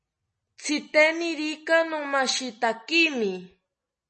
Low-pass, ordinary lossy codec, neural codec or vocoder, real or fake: 10.8 kHz; MP3, 32 kbps; none; real